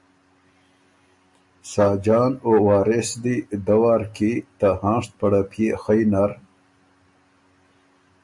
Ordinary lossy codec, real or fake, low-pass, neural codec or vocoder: MP3, 48 kbps; real; 10.8 kHz; none